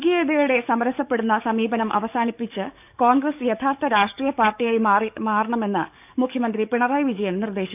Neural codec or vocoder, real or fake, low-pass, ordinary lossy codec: codec, 44.1 kHz, 7.8 kbps, DAC; fake; 3.6 kHz; none